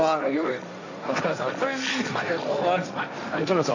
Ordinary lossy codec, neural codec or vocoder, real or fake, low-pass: none; codec, 16 kHz, 1.1 kbps, Voila-Tokenizer; fake; 7.2 kHz